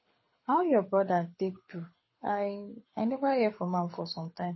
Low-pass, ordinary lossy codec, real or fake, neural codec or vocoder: 7.2 kHz; MP3, 24 kbps; fake; codec, 24 kHz, 6 kbps, HILCodec